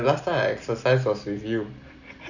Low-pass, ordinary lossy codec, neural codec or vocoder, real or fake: 7.2 kHz; none; none; real